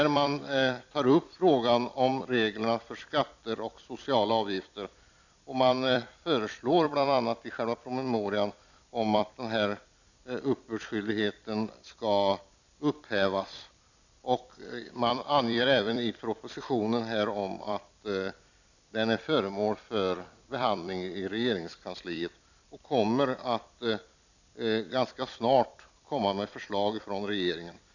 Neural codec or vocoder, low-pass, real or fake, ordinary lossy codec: vocoder, 44.1 kHz, 128 mel bands every 256 samples, BigVGAN v2; 7.2 kHz; fake; none